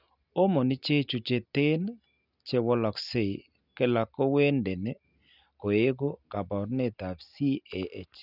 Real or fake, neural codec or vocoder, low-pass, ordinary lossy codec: real; none; 5.4 kHz; none